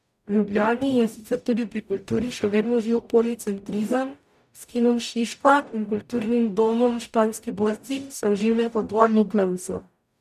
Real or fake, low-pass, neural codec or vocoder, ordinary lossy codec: fake; 14.4 kHz; codec, 44.1 kHz, 0.9 kbps, DAC; none